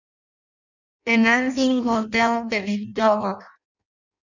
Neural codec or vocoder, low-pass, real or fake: codec, 16 kHz in and 24 kHz out, 0.6 kbps, FireRedTTS-2 codec; 7.2 kHz; fake